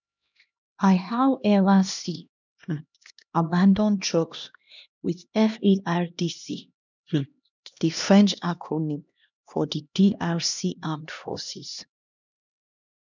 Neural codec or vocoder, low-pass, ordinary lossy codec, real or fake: codec, 16 kHz, 1 kbps, X-Codec, HuBERT features, trained on LibriSpeech; 7.2 kHz; none; fake